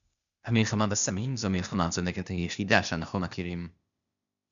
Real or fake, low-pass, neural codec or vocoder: fake; 7.2 kHz; codec, 16 kHz, 0.8 kbps, ZipCodec